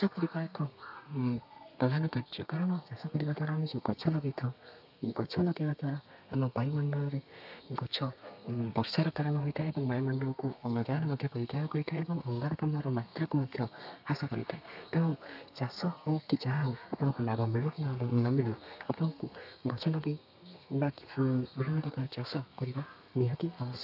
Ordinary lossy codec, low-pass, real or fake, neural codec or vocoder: AAC, 48 kbps; 5.4 kHz; fake; codec, 32 kHz, 1.9 kbps, SNAC